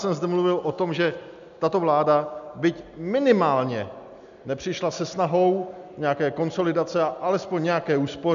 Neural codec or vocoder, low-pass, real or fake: none; 7.2 kHz; real